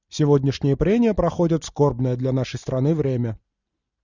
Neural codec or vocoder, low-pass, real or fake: none; 7.2 kHz; real